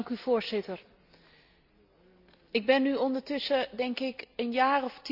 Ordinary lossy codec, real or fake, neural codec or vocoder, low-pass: none; real; none; 5.4 kHz